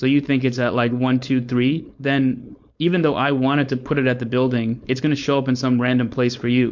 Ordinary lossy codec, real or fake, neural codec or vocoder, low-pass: MP3, 48 kbps; fake; codec, 16 kHz, 4.8 kbps, FACodec; 7.2 kHz